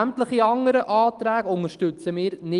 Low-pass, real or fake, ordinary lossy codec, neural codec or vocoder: 10.8 kHz; real; Opus, 24 kbps; none